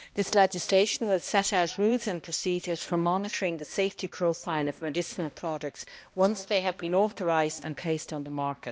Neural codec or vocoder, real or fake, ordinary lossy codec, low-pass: codec, 16 kHz, 1 kbps, X-Codec, HuBERT features, trained on balanced general audio; fake; none; none